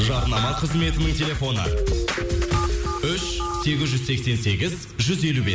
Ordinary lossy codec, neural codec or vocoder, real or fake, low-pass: none; none; real; none